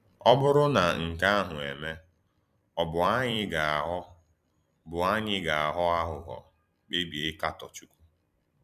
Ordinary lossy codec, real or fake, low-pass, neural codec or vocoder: none; fake; 14.4 kHz; vocoder, 44.1 kHz, 128 mel bands every 256 samples, BigVGAN v2